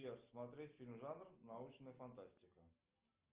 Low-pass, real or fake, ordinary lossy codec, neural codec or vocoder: 3.6 kHz; real; Opus, 24 kbps; none